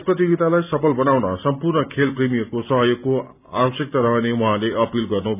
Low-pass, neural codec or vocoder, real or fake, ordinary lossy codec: 3.6 kHz; none; real; none